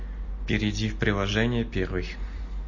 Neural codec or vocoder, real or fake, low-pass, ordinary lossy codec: none; real; 7.2 kHz; MP3, 32 kbps